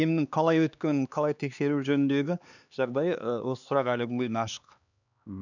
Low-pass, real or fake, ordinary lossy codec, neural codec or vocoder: 7.2 kHz; fake; none; codec, 16 kHz, 2 kbps, X-Codec, HuBERT features, trained on LibriSpeech